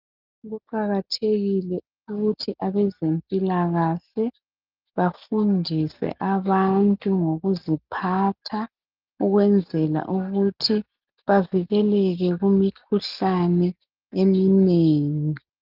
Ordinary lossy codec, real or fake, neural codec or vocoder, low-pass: Opus, 32 kbps; real; none; 5.4 kHz